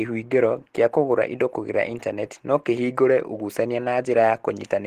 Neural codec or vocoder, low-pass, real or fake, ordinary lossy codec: none; 14.4 kHz; real; Opus, 16 kbps